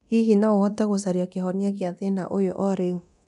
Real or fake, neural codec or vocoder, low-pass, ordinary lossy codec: fake; codec, 24 kHz, 0.9 kbps, DualCodec; 10.8 kHz; none